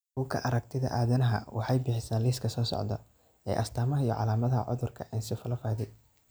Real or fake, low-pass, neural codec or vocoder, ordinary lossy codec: real; none; none; none